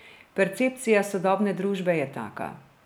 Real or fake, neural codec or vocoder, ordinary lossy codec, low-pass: real; none; none; none